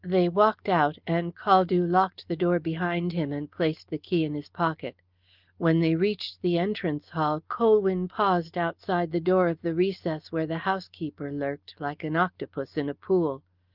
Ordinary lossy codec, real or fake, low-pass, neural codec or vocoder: Opus, 32 kbps; real; 5.4 kHz; none